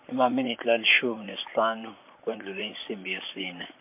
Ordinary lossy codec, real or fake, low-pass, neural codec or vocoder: MP3, 32 kbps; fake; 3.6 kHz; vocoder, 44.1 kHz, 128 mel bands, Pupu-Vocoder